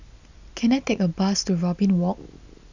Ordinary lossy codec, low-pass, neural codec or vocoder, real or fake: none; 7.2 kHz; none; real